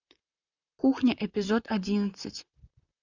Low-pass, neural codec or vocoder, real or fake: 7.2 kHz; vocoder, 44.1 kHz, 128 mel bands, Pupu-Vocoder; fake